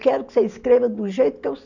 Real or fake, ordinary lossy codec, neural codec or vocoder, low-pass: real; none; none; 7.2 kHz